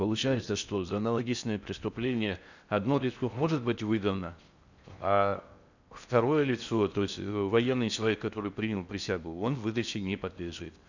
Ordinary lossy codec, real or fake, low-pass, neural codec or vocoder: none; fake; 7.2 kHz; codec, 16 kHz in and 24 kHz out, 0.6 kbps, FocalCodec, streaming, 4096 codes